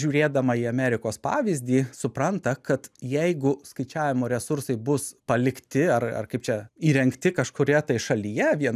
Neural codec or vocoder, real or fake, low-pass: none; real; 14.4 kHz